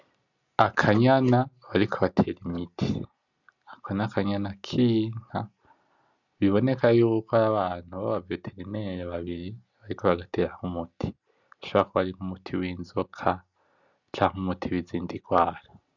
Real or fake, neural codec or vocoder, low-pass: real; none; 7.2 kHz